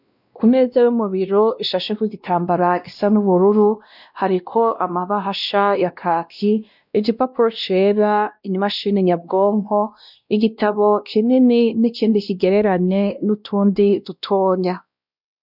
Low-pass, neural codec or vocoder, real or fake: 5.4 kHz; codec, 16 kHz, 1 kbps, X-Codec, WavLM features, trained on Multilingual LibriSpeech; fake